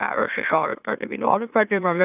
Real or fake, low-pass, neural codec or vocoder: fake; 3.6 kHz; autoencoder, 44.1 kHz, a latent of 192 numbers a frame, MeloTTS